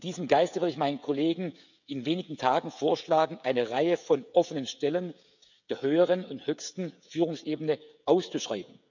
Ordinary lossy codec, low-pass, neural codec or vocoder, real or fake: none; 7.2 kHz; codec, 16 kHz, 16 kbps, FreqCodec, smaller model; fake